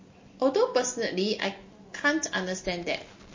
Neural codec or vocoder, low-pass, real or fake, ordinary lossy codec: none; 7.2 kHz; real; MP3, 32 kbps